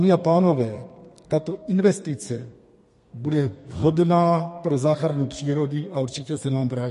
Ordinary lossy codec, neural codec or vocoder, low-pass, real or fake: MP3, 48 kbps; codec, 32 kHz, 1.9 kbps, SNAC; 14.4 kHz; fake